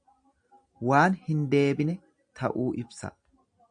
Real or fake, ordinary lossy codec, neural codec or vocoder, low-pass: real; MP3, 96 kbps; none; 9.9 kHz